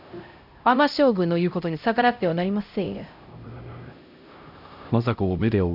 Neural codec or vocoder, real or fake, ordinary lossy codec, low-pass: codec, 16 kHz, 0.5 kbps, X-Codec, HuBERT features, trained on LibriSpeech; fake; none; 5.4 kHz